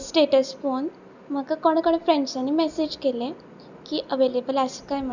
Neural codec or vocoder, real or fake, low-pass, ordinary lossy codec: none; real; 7.2 kHz; none